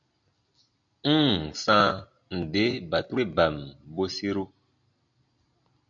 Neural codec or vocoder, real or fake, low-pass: none; real; 7.2 kHz